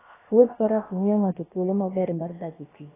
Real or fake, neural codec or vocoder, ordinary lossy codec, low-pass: fake; codec, 16 kHz, about 1 kbps, DyCAST, with the encoder's durations; AAC, 16 kbps; 3.6 kHz